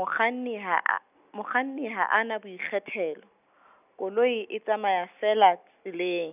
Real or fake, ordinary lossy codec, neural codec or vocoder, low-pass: real; none; none; 3.6 kHz